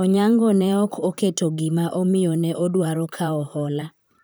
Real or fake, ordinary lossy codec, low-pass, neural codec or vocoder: fake; none; none; vocoder, 44.1 kHz, 128 mel bands, Pupu-Vocoder